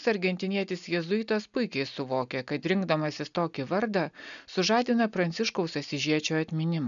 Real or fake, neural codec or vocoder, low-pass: real; none; 7.2 kHz